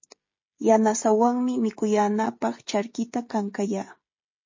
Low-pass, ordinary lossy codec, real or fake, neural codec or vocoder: 7.2 kHz; MP3, 32 kbps; fake; codec, 16 kHz, 16 kbps, FreqCodec, smaller model